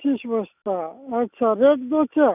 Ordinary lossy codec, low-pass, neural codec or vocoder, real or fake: none; 3.6 kHz; none; real